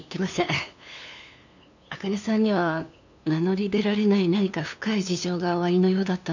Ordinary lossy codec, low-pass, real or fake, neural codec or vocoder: none; 7.2 kHz; fake; codec, 16 kHz, 2 kbps, FunCodec, trained on LibriTTS, 25 frames a second